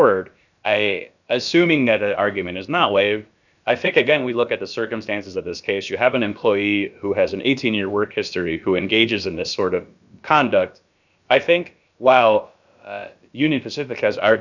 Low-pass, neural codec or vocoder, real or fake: 7.2 kHz; codec, 16 kHz, about 1 kbps, DyCAST, with the encoder's durations; fake